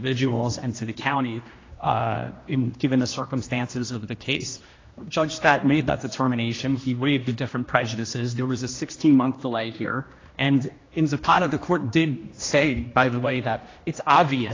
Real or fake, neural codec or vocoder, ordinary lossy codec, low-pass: fake; codec, 16 kHz, 1 kbps, X-Codec, HuBERT features, trained on general audio; AAC, 32 kbps; 7.2 kHz